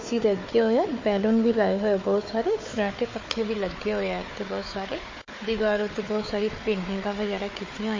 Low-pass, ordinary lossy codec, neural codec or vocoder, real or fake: 7.2 kHz; MP3, 32 kbps; codec, 16 kHz, 4 kbps, FunCodec, trained on Chinese and English, 50 frames a second; fake